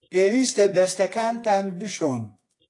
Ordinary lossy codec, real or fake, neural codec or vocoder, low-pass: AAC, 48 kbps; fake; codec, 24 kHz, 0.9 kbps, WavTokenizer, medium music audio release; 10.8 kHz